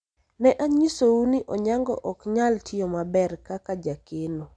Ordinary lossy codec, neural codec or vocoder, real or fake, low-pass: none; none; real; 9.9 kHz